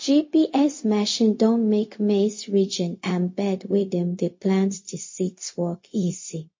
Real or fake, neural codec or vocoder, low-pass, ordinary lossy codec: fake; codec, 16 kHz, 0.4 kbps, LongCat-Audio-Codec; 7.2 kHz; MP3, 32 kbps